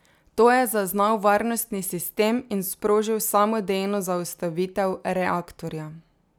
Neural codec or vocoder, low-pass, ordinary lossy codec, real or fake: none; none; none; real